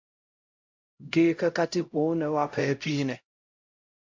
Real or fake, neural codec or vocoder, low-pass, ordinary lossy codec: fake; codec, 16 kHz, 0.5 kbps, X-Codec, HuBERT features, trained on LibriSpeech; 7.2 kHz; MP3, 48 kbps